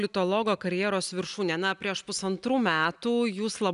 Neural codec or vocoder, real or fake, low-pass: none; real; 10.8 kHz